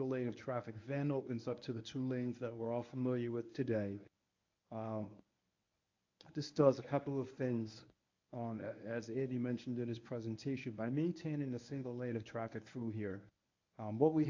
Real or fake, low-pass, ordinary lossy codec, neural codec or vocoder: fake; 7.2 kHz; AAC, 48 kbps; codec, 24 kHz, 0.9 kbps, WavTokenizer, medium speech release version 1